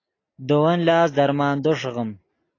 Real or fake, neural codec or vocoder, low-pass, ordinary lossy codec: real; none; 7.2 kHz; AAC, 32 kbps